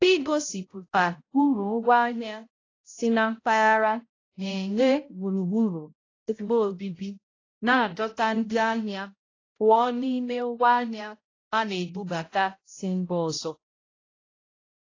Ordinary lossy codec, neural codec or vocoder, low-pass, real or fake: AAC, 32 kbps; codec, 16 kHz, 0.5 kbps, X-Codec, HuBERT features, trained on balanced general audio; 7.2 kHz; fake